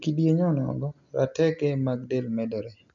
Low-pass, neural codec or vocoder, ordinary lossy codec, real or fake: 7.2 kHz; none; none; real